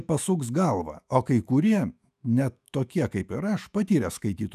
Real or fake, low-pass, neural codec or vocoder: fake; 14.4 kHz; autoencoder, 48 kHz, 128 numbers a frame, DAC-VAE, trained on Japanese speech